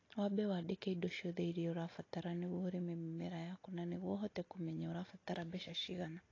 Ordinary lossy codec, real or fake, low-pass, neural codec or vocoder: AAC, 32 kbps; real; 7.2 kHz; none